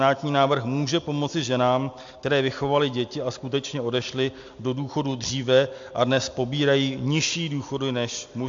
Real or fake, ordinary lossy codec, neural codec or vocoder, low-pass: real; AAC, 64 kbps; none; 7.2 kHz